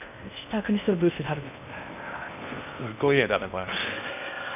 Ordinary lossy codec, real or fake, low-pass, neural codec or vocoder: none; fake; 3.6 kHz; codec, 16 kHz in and 24 kHz out, 0.6 kbps, FocalCodec, streaming, 2048 codes